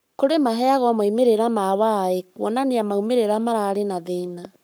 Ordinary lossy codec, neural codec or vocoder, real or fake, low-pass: none; codec, 44.1 kHz, 7.8 kbps, Pupu-Codec; fake; none